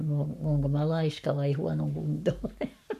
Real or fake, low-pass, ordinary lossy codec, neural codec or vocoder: fake; 14.4 kHz; none; codec, 44.1 kHz, 7.8 kbps, Pupu-Codec